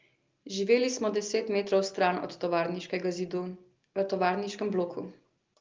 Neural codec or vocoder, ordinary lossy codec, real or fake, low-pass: none; Opus, 32 kbps; real; 7.2 kHz